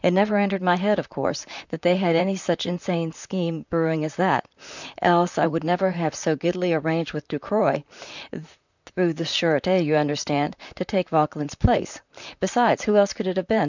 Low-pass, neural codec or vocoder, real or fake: 7.2 kHz; vocoder, 44.1 kHz, 128 mel bands, Pupu-Vocoder; fake